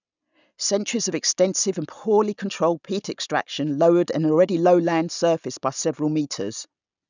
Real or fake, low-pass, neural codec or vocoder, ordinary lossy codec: real; 7.2 kHz; none; none